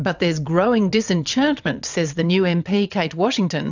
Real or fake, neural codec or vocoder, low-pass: fake; vocoder, 22.05 kHz, 80 mel bands, WaveNeXt; 7.2 kHz